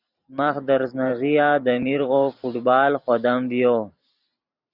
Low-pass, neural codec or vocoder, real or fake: 5.4 kHz; vocoder, 44.1 kHz, 128 mel bands every 512 samples, BigVGAN v2; fake